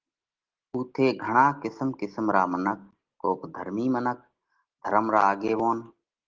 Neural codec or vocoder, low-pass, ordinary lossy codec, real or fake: none; 7.2 kHz; Opus, 16 kbps; real